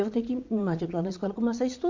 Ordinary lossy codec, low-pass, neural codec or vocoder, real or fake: MP3, 64 kbps; 7.2 kHz; vocoder, 22.05 kHz, 80 mel bands, Vocos; fake